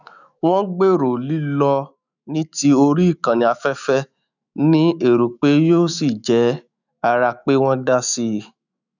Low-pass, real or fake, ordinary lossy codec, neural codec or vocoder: 7.2 kHz; fake; none; autoencoder, 48 kHz, 128 numbers a frame, DAC-VAE, trained on Japanese speech